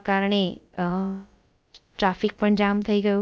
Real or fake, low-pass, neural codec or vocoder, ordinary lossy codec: fake; none; codec, 16 kHz, about 1 kbps, DyCAST, with the encoder's durations; none